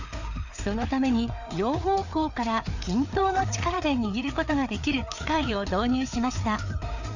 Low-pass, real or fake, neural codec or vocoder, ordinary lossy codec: 7.2 kHz; fake; codec, 16 kHz, 4 kbps, FreqCodec, larger model; none